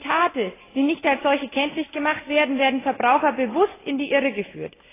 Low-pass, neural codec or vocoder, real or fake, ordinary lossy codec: 3.6 kHz; none; real; AAC, 16 kbps